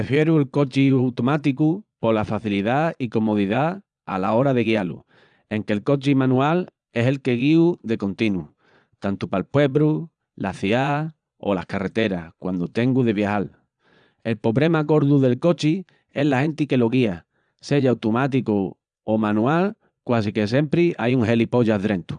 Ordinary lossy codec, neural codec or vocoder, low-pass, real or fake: none; vocoder, 22.05 kHz, 80 mel bands, Vocos; 9.9 kHz; fake